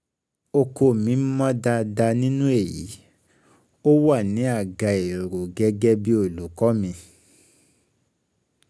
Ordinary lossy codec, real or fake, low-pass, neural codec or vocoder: none; real; none; none